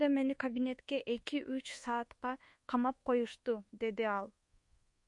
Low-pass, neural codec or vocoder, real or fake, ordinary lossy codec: 10.8 kHz; codec, 24 kHz, 1.2 kbps, DualCodec; fake; MP3, 48 kbps